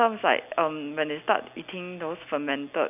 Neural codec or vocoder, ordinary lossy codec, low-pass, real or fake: none; none; 3.6 kHz; real